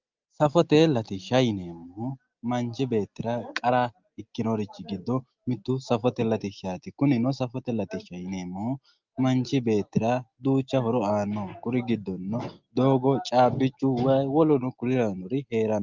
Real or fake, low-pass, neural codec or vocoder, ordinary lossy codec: real; 7.2 kHz; none; Opus, 32 kbps